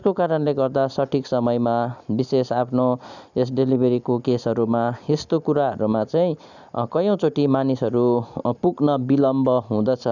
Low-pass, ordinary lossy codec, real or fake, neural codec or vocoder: 7.2 kHz; none; real; none